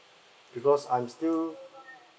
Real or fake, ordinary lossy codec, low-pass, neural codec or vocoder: real; none; none; none